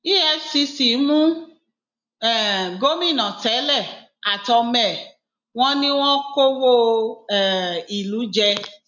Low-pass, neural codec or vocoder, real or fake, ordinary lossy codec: 7.2 kHz; none; real; none